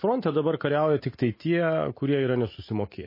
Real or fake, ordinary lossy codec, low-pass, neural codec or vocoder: real; MP3, 24 kbps; 5.4 kHz; none